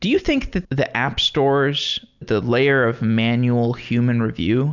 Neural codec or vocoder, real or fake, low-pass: none; real; 7.2 kHz